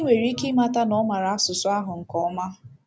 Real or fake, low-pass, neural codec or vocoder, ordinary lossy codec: real; none; none; none